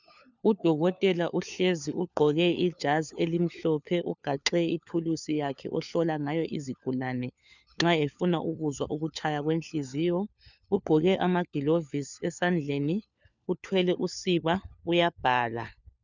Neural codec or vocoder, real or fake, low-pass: codec, 16 kHz, 4 kbps, FunCodec, trained on LibriTTS, 50 frames a second; fake; 7.2 kHz